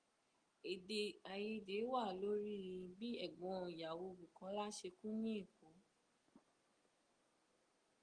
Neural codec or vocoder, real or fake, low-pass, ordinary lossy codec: none; real; 9.9 kHz; Opus, 24 kbps